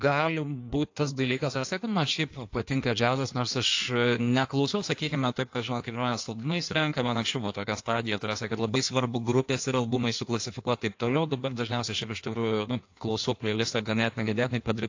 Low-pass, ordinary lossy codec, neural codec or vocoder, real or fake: 7.2 kHz; AAC, 48 kbps; codec, 16 kHz in and 24 kHz out, 1.1 kbps, FireRedTTS-2 codec; fake